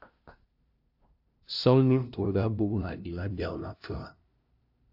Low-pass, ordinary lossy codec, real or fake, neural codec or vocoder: 5.4 kHz; AAC, 48 kbps; fake; codec, 16 kHz, 0.5 kbps, FunCodec, trained on LibriTTS, 25 frames a second